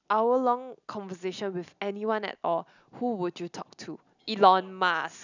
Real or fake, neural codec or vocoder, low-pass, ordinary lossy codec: real; none; 7.2 kHz; none